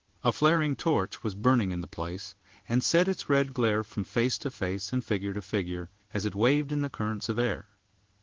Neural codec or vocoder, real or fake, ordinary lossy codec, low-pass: codec, 16 kHz in and 24 kHz out, 1 kbps, XY-Tokenizer; fake; Opus, 16 kbps; 7.2 kHz